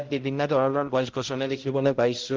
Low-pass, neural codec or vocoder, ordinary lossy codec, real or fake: 7.2 kHz; codec, 16 kHz, 0.5 kbps, X-Codec, HuBERT features, trained on balanced general audio; Opus, 16 kbps; fake